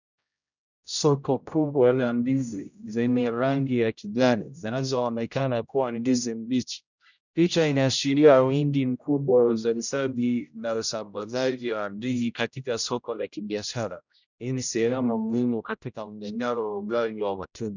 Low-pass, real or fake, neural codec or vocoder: 7.2 kHz; fake; codec, 16 kHz, 0.5 kbps, X-Codec, HuBERT features, trained on general audio